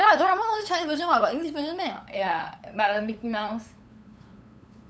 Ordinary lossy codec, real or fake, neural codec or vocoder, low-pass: none; fake; codec, 16 kHz, 8 kbps, FunCodec, trained on LibriTTS, 25 frames a second; none